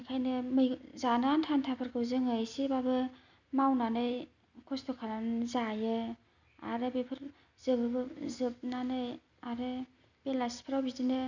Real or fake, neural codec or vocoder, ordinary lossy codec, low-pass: real; none; none; 7.2 kHz